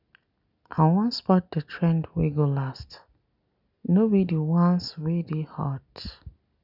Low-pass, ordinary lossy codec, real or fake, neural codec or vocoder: 5.4 kHz; none; real; none